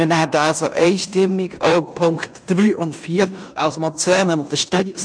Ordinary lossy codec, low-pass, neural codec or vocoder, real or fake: MP3, 96 kbps; 9.9 kHz; codec, 16 kHz in and 24 kHz out, 0.9 kbps, LongCat-Audio-Codec, fine tuned four codebook decoder; fake